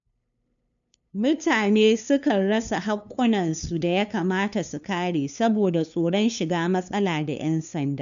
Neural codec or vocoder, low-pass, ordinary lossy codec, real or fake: codec, 16 kHz, 2 kbps, FunCodec, trained on LibriTTS, 25 frames a second; 7.2 kHz; none; fake